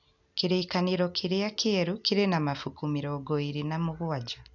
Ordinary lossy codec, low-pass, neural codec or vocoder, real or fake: none; 7.2 kHz; none; real